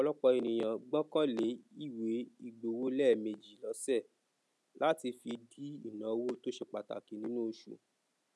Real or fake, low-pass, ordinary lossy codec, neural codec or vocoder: real; none; none; none